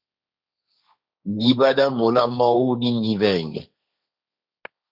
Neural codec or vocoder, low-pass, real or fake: codec, 16 kHz, 1.1 kbps, Voila-Tokenizer; 5.4 kHz; fake